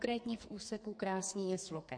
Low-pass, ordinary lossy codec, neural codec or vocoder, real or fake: 9.9 kHz; MP3, 48 kbps; codec, 44.1 kHz, 2.6 kbps, SNAC; fake